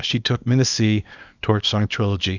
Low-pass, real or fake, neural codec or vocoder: 7.2 kHz; fake; codec, 24 kHz, 0.9 kbps, WavTokenizer, small release